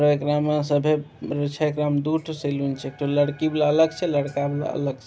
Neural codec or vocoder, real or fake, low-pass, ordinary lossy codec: none; real; none; none